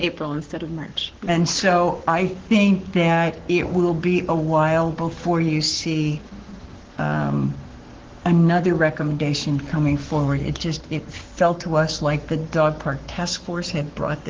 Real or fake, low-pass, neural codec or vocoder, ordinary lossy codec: fake; 7.2 kHz; codec, 44.1 kHz, 7.8 kbps, Pupu-Codec; Opus, 16 kbps